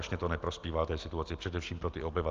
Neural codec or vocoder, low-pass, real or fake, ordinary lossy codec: none; 7.2 kHz; real; Opus, 32 kbps